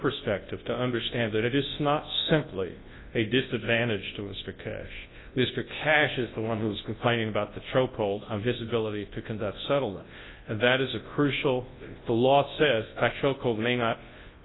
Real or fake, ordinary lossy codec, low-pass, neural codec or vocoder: fake; AAC, 16 kbps; 7.2 kHz; codec, 24 kHz, 0.9 kbps, WavTokenizer, large speech release